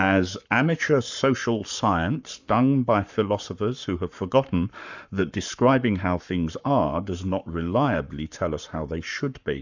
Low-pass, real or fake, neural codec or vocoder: 7.2 kHz; fake; codec, 44.1 kHz, 7.8 kbps, Pupu-Codec